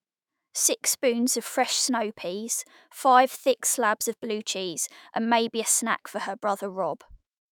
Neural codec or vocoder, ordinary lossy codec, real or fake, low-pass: autoencoder, 48 kHz, 128 numbers a frame, DAC-VAE, trained on Japanese speech; none; fake; none